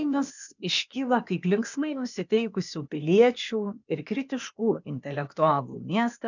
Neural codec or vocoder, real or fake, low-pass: codec, 16 kHz, 0.8 kbps, ZipCodec; fake; 7.2 kHz